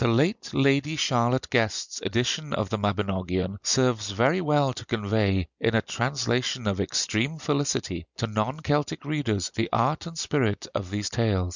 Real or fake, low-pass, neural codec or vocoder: real; 7.2 kHz; none